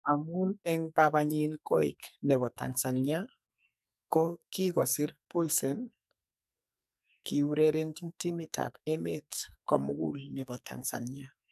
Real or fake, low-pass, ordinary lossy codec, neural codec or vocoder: fake; 14.4 kHz; none; codec, 44.1 kHz, 2.6 kbps, SNAC